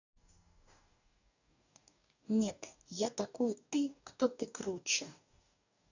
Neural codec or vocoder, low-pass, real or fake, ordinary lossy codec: codec, 44.1 kHz, 2.6 kbps, DAC; 7.2 kHz; fake; none